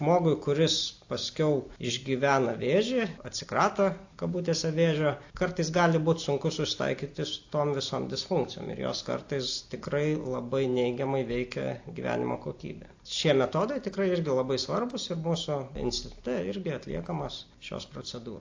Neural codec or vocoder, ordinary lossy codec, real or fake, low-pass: none; AAC, 48 kbps; real; 7.2 kHz